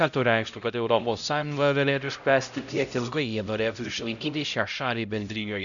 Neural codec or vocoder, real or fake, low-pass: codec, 16 kHz, 0.5 kbps, X-Codec, HuBERT features, trained on LibriSpeech; fake; 7.2 kHz